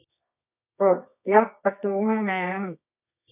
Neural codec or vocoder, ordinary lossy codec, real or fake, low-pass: codec, 24 kHz, 0.9 kbps, WavTokenizer, medium music audio release; none; fake; 3.6 kHz